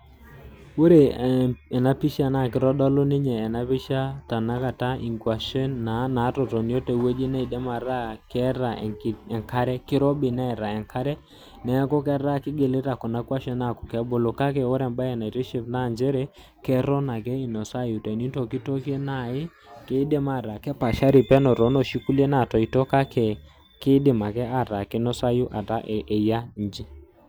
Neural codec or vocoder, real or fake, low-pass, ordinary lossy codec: none; real; none; none